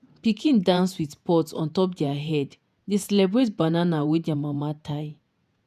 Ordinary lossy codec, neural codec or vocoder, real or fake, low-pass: none; vocoder, 48 kHz, 128 mel bands, Vocos; fake; 14.4 kHz